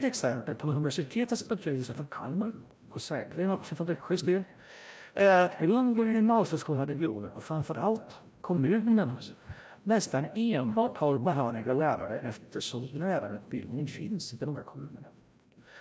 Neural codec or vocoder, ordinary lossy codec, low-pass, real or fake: codec, 16 kHz, 0.5 kbps, FreqCodec, larger model; none; none; fake